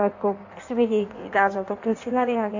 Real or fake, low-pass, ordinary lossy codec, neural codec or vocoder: fake; 7.2 kHz; AAC, 48 kbps; codec, 16 kHz in and 24 kHz out, 1.1 kbps, FireRedTTS-2 codec